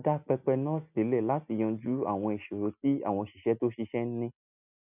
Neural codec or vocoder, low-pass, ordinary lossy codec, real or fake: none; 3.6 kHz; none; real